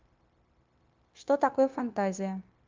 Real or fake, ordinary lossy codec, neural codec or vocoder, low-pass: fake; Opus, 32 kbps; codec, 16 kHz, 0.9 kbps, LongCat-Audio-Codec; 7.2 kHz